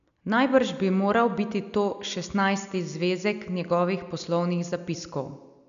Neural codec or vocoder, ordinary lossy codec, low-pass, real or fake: none; none; 7.2 kHz; real